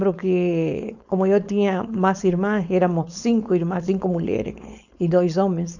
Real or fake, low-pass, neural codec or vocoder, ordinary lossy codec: fake; 7.2 kHz; codec, 16 kHz, 4.8 kbps, FACodec; none